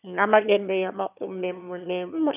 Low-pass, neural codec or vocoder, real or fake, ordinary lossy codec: 3.6 kHz; autoencoder, 22.05 kHz, a latent of 192 numbers a frame, VITS, trained on one speaker; fake; none